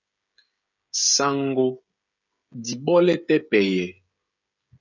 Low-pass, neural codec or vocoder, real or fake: 7.2 kHz; codec, 16 kHz, 16 kbps, FreqCodec, smaller model; fake